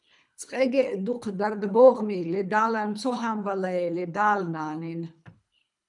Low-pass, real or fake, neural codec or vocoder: 10.8 kHz; fake; codec, 24 kHz, 3 kbps, HILCodec